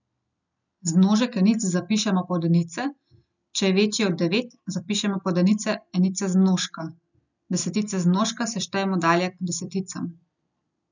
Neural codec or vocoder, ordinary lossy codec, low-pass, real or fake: none; none; 7.2 kHz; real